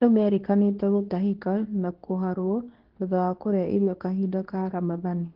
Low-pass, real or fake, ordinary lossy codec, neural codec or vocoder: 5.4 kHz; fake; Opus, 16 kbps; codec, 24 kHz, 0.9 kbps, WavTokenizer, medium speech release version 2